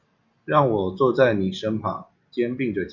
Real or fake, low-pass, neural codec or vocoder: real; 7.2 kHz; none